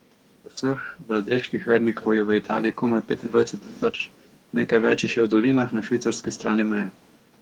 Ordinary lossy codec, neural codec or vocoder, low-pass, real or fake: Opus, 32 kbps; codec, 44.1 kHz, 2.6 kbps, DAC; 19.8 kHz; fake